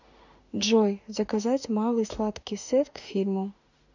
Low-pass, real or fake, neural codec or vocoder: 7.2 kHz; fake; autoencoder, 48 kHz, 32 numbers a frame, DAC-VAE, trained on Japanese speech